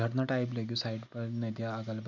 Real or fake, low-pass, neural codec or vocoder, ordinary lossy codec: real; 7.2 kHz; none; none